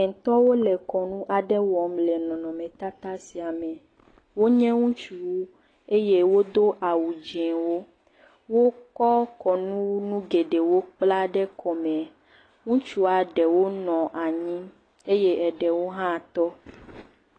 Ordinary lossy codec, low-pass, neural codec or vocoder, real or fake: AAC, 32 kbps; 9.9 kHz; none; real